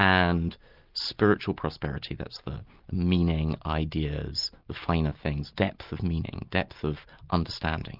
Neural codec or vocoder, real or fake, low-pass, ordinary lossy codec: none; real; 5.4 kHz; Opus, 32 kbps